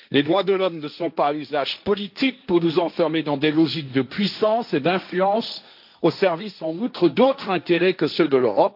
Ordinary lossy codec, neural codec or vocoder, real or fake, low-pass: AAC, 48 kbps; codec, 16 kHz, 1.1 kbps, Voila-Tokenizer; fake; 5.4 kHz